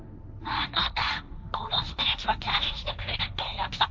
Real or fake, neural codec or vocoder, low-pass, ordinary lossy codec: fake; codec, 16 kHz, 1.1 kbps, Voila-Tokenizer; none; none